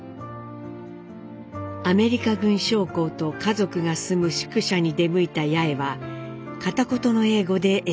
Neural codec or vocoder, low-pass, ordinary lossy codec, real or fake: none; none; none; real